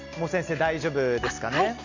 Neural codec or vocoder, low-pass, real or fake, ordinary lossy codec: none; 7.2 kHz; real; none